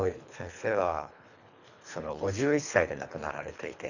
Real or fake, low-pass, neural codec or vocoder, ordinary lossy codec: fake; 7.2 kHz; codec, 24 kHz, 3 kbps, HILCodec; none